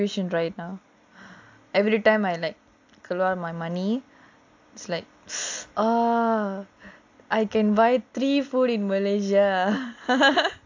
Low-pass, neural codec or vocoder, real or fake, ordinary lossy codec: 7.2 kHz; none; real; AAC, 48 kbps